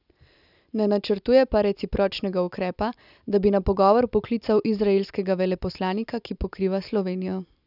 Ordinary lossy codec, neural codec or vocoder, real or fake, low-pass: none; none; real; 5.4 kHz